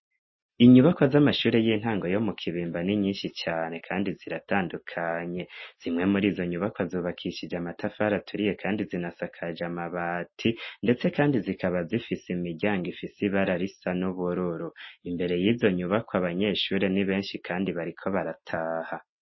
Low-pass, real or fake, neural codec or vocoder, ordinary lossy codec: 7.2 kHz; real; none; MP3, 24 kbps